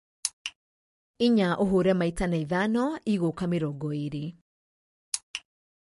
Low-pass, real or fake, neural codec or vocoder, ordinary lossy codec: 14.4 kHz; real; none; MP3, 48 kbps